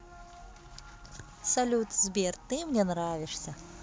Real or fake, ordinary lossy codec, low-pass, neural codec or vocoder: real; none; none; none